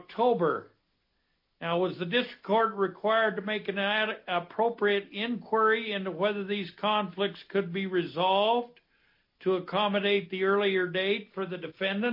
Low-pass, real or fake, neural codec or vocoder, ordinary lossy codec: 5.4 kHz; real; none; MP3, 32 kbps